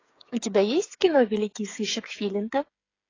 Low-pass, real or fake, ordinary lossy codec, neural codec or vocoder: 7.2 kHz; fake; AAC, 32 kbps; codec, 16 kHz, 8 kbps, FreqCodec, smaller model